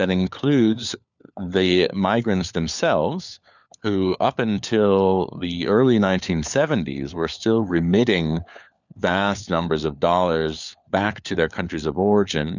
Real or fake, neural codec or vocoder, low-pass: fake; codec, 16 kHz, 4 kbps, FunCodec, trained on LibriTTS, 50 frames a second; 7.2 kHz